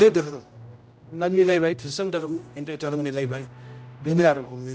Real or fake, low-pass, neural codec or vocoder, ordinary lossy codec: fake; none; codec, 16 kHz, 0.5 kbps, X-Codec, HuBERT features, trained on general audio; none